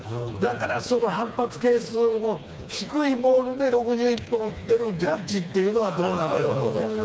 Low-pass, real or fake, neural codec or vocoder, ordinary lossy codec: none; fake; codec, 16 kHz, 2 kbps, FreqCodec, smaller model; none